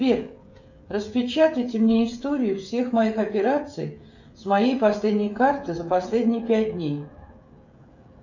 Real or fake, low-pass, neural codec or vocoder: fake; 7.2 kHz; codec, 16 kHz, 16 kbps, FreqCodec, smaller model